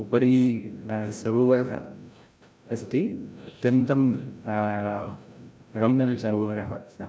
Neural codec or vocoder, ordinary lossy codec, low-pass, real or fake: codec, 16 kHz, 0.5 kbps, FreqCodec, larger model; none; none; fake